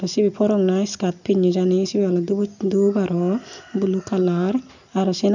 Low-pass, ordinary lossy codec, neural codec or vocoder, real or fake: 7.2 kHz; none; none; real